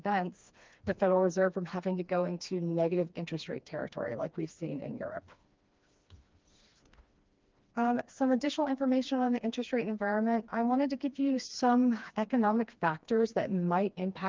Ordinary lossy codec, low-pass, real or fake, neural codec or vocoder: Opus, 24 kbps; 7.2 kHz; fake; codec, 16 kHz, 2 kbps, FreqCodec, smaller model